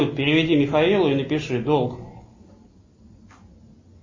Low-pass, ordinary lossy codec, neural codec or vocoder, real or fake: 7.2 kHz; MP3, 32 kbps; none; real